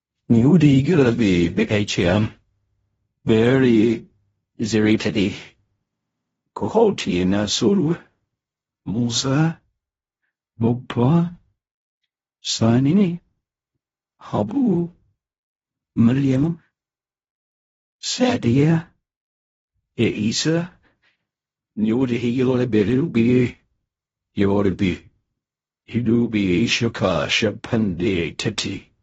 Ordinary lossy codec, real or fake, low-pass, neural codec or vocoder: AAC, 24 kbps; fake; 10.8 kHz; codec, 16 kHz in and 24 kHz out, 0.4 kbps, LongCat-Audio-Codec, fine tuned four codebook decoder